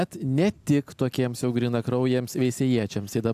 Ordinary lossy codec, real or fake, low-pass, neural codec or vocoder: AAC, 96 kbps; real; 14.4 kHz; none